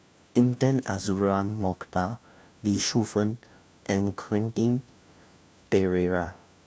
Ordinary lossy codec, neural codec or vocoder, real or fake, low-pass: none; codec, 16 kHz, 1 kbps, FunCodec, trained on LibriTTS, 50 frames a second; fake; none